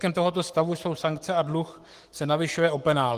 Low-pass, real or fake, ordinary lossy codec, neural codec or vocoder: 14.4 kHz; fake; Opus, 24 kbps; codec, 44.1 kHz, 7.8 kbps, Pupu-Codec